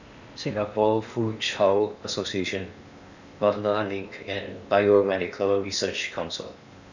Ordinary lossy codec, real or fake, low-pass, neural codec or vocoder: none; fake; 7.2 kHz; codec, 16 kHz in and 24 kHz out, 0.8 kbps, FocalCodec, streaming, 65536 codes